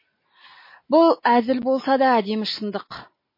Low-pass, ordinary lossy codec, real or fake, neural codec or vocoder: 5.4 kHz; MP3, 24 kbps; real; none